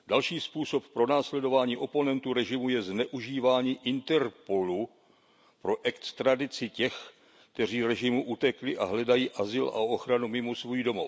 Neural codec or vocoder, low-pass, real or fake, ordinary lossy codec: none; none; real; none